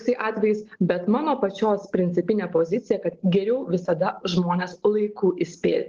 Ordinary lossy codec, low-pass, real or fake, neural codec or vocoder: Opus, 16 kbps; 7.2 kHz; real; none